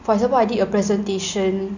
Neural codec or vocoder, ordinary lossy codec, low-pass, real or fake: none; none; 7.2 kHz; real